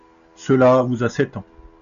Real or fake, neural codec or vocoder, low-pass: real; none; 7.2 kHz